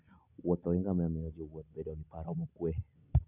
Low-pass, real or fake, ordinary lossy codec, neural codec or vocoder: 3.6 kHz; real; none; none